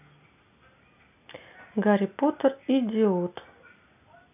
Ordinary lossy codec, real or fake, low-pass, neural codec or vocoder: none; real; 3.6 kHz; none